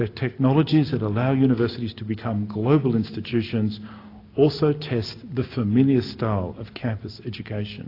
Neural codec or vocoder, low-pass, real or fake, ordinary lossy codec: none; 5.4 kHz; real; AAC, 32 kbps